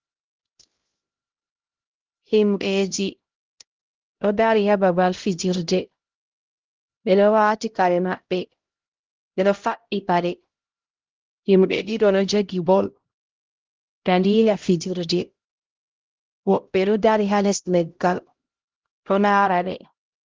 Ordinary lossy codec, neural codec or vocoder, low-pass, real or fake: Opus, 16 kbps; codec, 16 kHz, 0.5 kbps, X-Codec, HuBERT features, trained on LibriSpeech; 7.2 kHz; fake